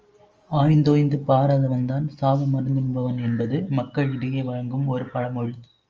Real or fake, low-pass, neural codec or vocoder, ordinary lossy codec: real; 7.2 kHz; none; Opus, 24 kbps